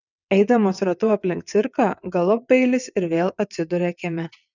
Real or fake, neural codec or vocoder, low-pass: fake; vocoder, 22.05 kHz, 80 mel bands, WaveNeXt; 7.2 kHz